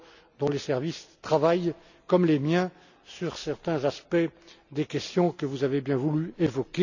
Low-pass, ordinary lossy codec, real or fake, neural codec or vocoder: 7.2 kHz; none; real; none